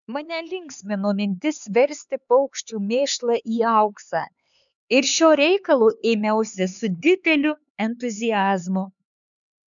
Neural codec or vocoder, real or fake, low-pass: codec, 16 kHz, 4 kbps, X-Codec, HuBERT features, trained on LibriSpeech; fake; 7.2 kHz